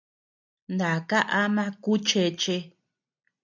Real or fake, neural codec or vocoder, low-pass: real; none; 7.2 kHz